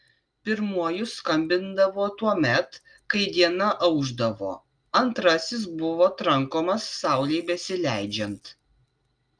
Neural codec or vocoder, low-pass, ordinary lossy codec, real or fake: none; 9.9 kHz; Opus, 32 kbps; real